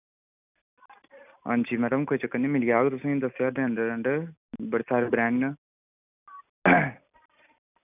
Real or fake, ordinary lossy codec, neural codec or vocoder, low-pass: real; none; none; 3.6 kHz